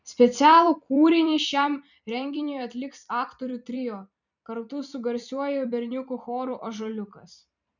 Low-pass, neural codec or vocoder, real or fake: 7.2 kHz; vocoder, 44.1 kHz, 128 mel bands every 256 samples, BigVGAN v2; fake